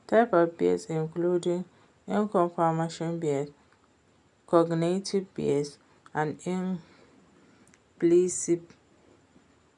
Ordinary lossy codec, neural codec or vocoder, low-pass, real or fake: none; none; 10.8 kHz; real